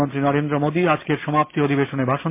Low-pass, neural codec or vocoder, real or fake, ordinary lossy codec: 3.6 kHz; none; real; MP3, 24 kbps